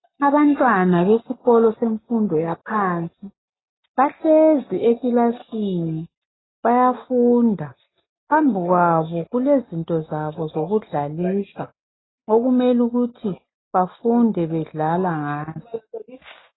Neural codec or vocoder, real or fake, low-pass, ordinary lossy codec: none; real; 7.2 kHz; AAC, 16 kbps